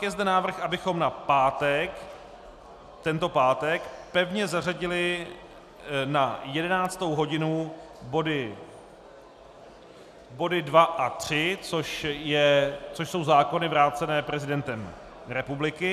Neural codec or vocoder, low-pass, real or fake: none; 14.4 kHz; real